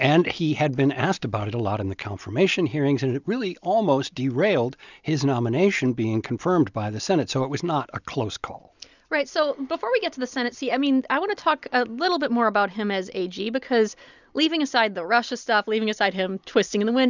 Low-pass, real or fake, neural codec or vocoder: 7.2 kHz; real; none